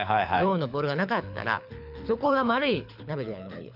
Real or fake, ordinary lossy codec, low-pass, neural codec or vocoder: fake; none; 5.4 kHz; codec, 24 kHz, 6 kbps, HILCodec